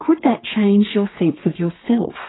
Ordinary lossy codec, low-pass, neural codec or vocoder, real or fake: AAC, 16 kbps; 7.2 kHz; codec, 32 kHz, 1.9 kbps, SNAC; fake